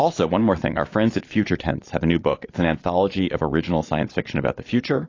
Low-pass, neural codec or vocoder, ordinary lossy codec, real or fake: 7.2 kHz; vocoder, 22.05 kHz, 80 mel bands, Vocos; AAC, 32 kbps; fake